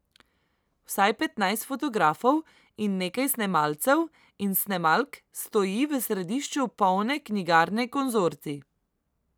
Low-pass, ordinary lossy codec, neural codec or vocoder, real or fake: none; none; none; real